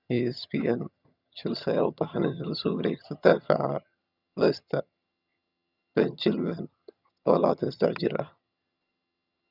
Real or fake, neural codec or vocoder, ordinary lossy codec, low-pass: fake; vocoder, 22.05 kHz, 80 mel bands, HiFi-GAN; none; 5.4 kHz